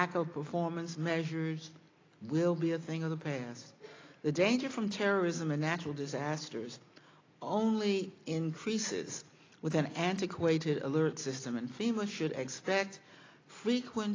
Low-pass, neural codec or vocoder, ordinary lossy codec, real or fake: 7.2 kHz; none; AAC, 32 kbps; real